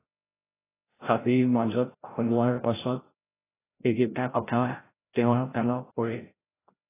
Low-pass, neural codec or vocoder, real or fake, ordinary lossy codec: 3.6 kHz; codec, 16 kHz, 0.5 kbps, FreqCodec, larger model; fake; AAC, 16 kbps